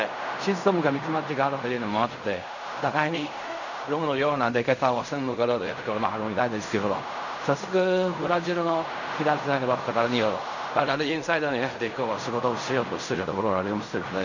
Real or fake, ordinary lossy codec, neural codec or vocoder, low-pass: fake; none; codec, 16 kHz in and 24 kHz out, 0.4 kbps, LongCat-Audio-Codec, fine tuned four codebook decoder; 7.2 kHz